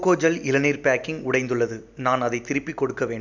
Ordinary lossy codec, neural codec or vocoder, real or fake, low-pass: none; none; real; 7.2 kHz